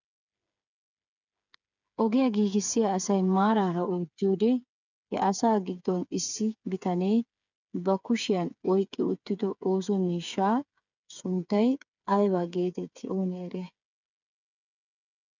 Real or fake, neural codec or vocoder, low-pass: fake; codec, 16 kHz, 8 kbps, FreqCodec, smaller model; 7.2 kHz